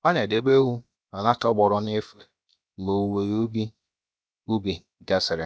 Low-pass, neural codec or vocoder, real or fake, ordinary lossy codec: none; codec, 16 kHz, about 1 kbps, DyCAST, with the encoder's durations; fake; none